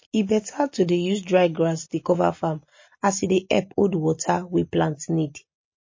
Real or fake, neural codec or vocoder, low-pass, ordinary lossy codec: real; none; 7.2 kHz; MP3, 32 kbps